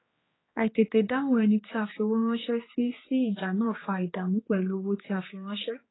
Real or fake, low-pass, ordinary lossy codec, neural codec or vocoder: fake; 7.2 kHz; AAC, 16 kbps; codec, 16 kHz, 4 kbps, X-Codec, HuBERT features, trained on general audio